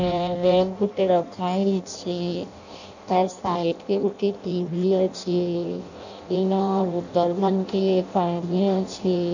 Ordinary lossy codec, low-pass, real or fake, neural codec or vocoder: none; 7.2 kHz; fake; codec, 16 kHz in and 24 kHz out, 0.6 kbps, FireRedTTS-2 codec